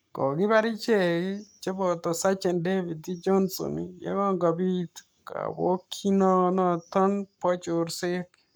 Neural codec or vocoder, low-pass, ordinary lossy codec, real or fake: codec, 44.1 kHz, 7.8 kbps, Pupu-Codec; none; none; fake